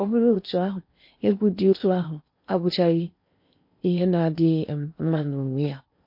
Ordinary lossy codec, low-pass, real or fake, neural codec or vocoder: MP3, 32 kbps; 5.4 kHz; fake; codec, 16 kHz in and 24 kHz out, 0.8 kbps, FocalCodec, streaming, 65536 codes